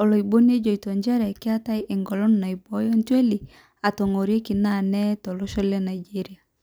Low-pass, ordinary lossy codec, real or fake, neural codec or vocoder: none; none; real; none